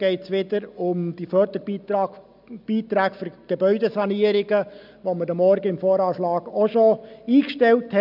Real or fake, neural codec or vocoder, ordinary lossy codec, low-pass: real; none; none; 5.4 kHz